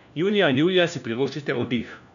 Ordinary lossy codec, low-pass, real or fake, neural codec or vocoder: none; 7.2 kHz; fake; codec, 16 kHz, 1 kbps, FunCodec, trained on LibriTTS, 50 frames a second